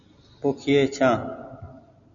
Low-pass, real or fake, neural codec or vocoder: 7.2 kHz; real; none